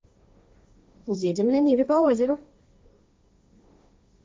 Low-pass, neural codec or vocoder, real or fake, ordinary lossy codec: none; codec, 16 kHz, 1.1 kbps, Voila-Tokenizer; fake; none